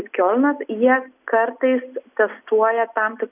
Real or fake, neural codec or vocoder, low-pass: real; none; 3.6 kHz